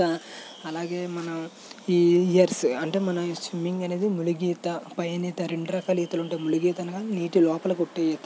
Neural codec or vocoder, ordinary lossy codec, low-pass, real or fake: none; none; none; real